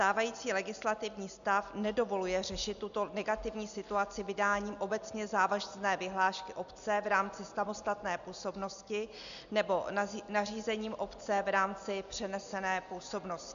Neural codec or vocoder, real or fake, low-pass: none; real; 7.2 kHz